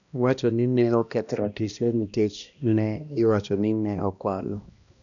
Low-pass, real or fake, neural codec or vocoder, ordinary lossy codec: 7.2 kHz; fake; codec, 16 kHz, 1 kbps, X-Codec, HuBERT features, trained on balanced general audio; none